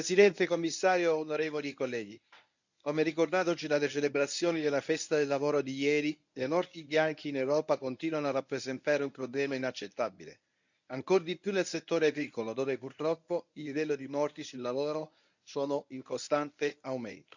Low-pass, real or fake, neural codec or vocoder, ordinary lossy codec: 7.2 kHz; fake; codec, 24 kHz, 0.9 kbps, WavTokenizer, medium speech release version 1; none